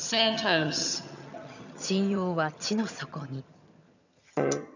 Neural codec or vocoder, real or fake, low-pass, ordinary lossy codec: vocoder, 22.05 kHz, 80 mel bands, HiFi-GAN; fake; 7.2 kHz; none